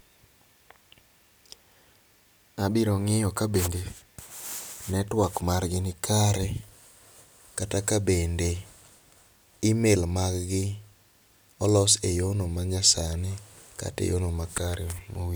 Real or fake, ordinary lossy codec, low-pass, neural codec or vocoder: real; none; none; none